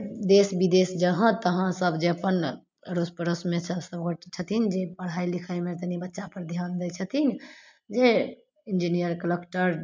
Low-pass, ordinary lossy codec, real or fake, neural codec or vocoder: 7.2 kHz; MP3, 64 kbps; real; none